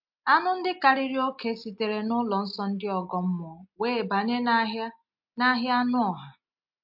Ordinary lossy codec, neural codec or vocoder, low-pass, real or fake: MP3, 48 kbps; none; 5.4 kHz; real